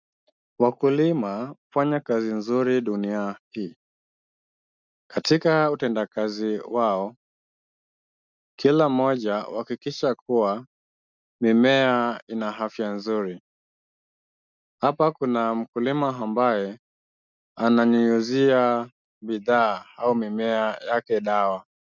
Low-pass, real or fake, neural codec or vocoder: 7.2 kHz; real; none